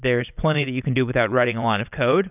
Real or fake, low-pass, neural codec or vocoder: fake; 3.6 kHz; vocoder, 22.05 kHz, 80 mel bands, WaveNeXt